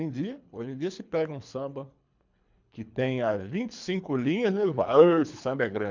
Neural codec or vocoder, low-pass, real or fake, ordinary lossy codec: codec, 24 kHz, 3 kbps, HILCodec; 7.2 kHz; fake; MP3, 64 kbps